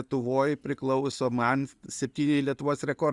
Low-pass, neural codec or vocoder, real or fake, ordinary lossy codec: 10.8 kHz; none; real; Opus, 64 kbps